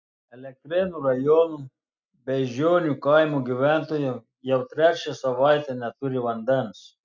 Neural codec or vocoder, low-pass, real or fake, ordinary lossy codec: none; 7.2 kHz; real; MP3, 64 kbps